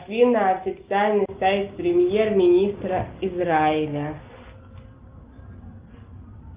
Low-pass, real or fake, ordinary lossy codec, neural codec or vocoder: 3.6 kHz; real; Opus, 24 kbps; none